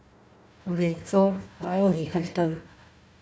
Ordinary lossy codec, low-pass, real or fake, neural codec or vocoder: none; none; fake; codec, 16 kHz, 1 kbps, FunCodec, trained on Chinese and English, 50 frames a second